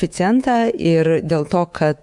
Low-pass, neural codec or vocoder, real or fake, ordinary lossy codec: 10.8 kHz; codec, 24 kHz, 3.1 kbps, DualCodec; fake; Opus, 64 kbps